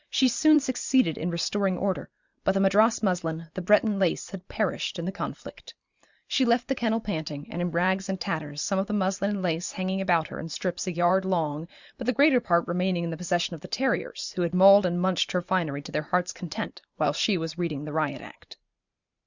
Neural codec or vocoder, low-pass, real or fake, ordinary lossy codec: vocoder, 44.1 kHz, 128 mel bands every 512 samples, BigVGAN v2; 7.2 kHz; fake; Opus, 64 kbps